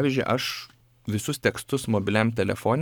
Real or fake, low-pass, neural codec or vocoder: fake; 19.8 kHz; codec, 44.1 kHz, 7.8 kbps, Pupu-Codec